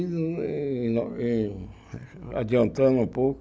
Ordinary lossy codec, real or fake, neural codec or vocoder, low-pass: none; real; none; none